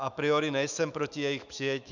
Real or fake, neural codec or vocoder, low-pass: fake; autoencoder, 48 kHz, 128 numbers a frame, DAC-VAE, trained on Japanese speech; 7.2 kHz